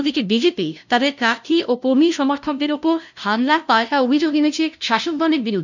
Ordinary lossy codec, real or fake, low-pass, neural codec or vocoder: none; fake; 7.2 kHz; codec, 16 kHz, 0.5 kbps, FunCodec, trained on LibriTTS, 25 frames a second